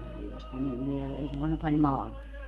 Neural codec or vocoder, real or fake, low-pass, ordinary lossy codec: codec, 32 kHz, 1.9 kbps, SNAC; fake; 14.4 kHz; Opus, 32 kbps